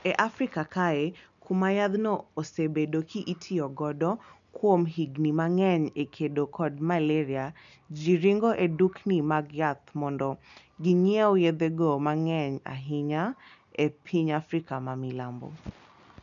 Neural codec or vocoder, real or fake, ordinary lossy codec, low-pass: none; real; none; 7.2 kHz